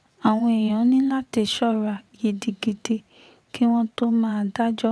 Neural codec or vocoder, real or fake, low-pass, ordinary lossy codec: vocoder, 22.05 kHz, 80 mel bands, WaveNeXt; fake; none; none